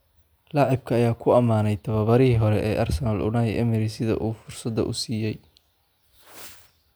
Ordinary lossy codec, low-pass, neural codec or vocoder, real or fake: none; none; none; real